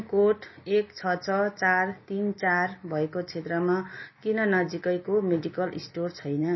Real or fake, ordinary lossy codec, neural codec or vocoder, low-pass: real; MP3, 24 kbps; none; 7.2 kHz